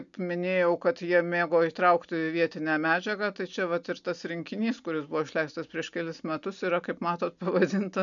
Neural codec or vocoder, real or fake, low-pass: none; real; 7.2 kHz